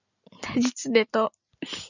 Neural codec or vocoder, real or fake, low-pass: none; real; 7.2 kHz